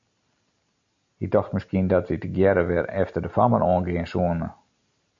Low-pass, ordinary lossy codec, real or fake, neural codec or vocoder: 7.2 kHz; AAC, 64 kbps; real; none